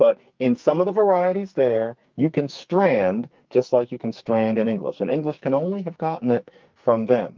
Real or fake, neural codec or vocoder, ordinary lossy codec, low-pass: fake; codec, 44.1 kHz, 2.6 kbps, SNAC; Opus, 24 kbps; 7.2 kHz